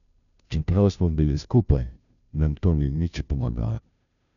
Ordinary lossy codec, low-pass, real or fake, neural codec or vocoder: none; 7.2 kHz; fake; codec, 16 kHz, 0.5 kbps, FunCodec, trained on Chinese and English, 25 frames a second